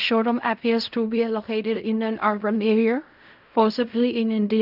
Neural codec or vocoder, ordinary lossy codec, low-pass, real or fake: codec, 16 kHz in and 24 kHz out, 0.4 kbps, LongCat-Audio-Codec, fine tuned four codebook decoder; none; 5.4 kHz; fake